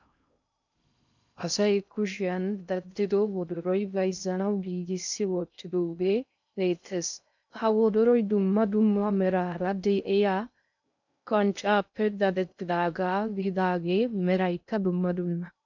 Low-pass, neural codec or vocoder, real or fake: 7.2 kHz; codec, 16 kHz in and 24 kHz out, 0.6 kbps, FocalCodec, streaming, 2048 codes; fake